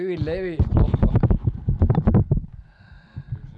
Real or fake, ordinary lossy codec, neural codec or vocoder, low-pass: real; none; none; none